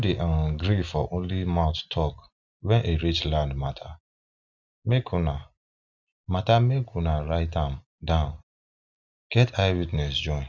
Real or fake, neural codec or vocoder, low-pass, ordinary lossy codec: real; none; 7.2 kHz; none